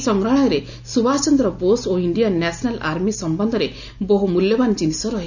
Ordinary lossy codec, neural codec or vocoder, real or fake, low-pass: none; none; real; 7.2 kHz